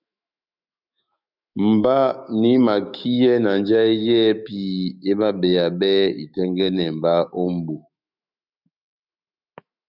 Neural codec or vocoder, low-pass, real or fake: autoencoder, 48 kHz, 128 numbers a frame, DAC-VAE, trained on Japanese speech; 5.4 kHz; fake